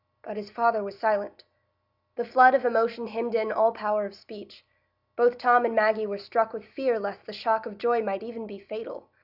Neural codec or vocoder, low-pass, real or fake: none; 5.4 kHz; real